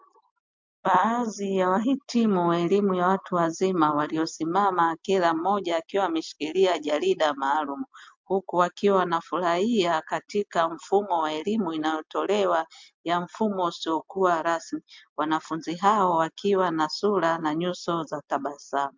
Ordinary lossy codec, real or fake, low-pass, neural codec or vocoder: MP3, 64 kbps; real; 7.2 kHz; none